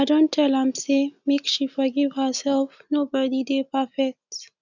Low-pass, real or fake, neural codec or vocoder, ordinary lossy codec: 7.2 kHz; real; none; none